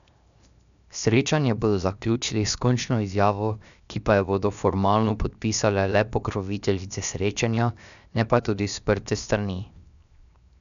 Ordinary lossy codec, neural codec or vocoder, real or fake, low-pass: none; codec, 16 kHz, 0.7 kbps, FocalCodec; fake; 7.2 kHz